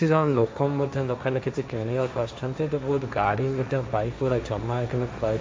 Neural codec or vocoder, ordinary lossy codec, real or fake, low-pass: codec, 16 kHz, 1.1 kbps, Voila-Tokenizer; none; fake; none